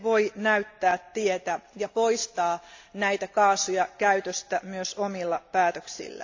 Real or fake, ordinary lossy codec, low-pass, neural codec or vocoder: real; AAC, 48 kbps; 7.2 kHz; none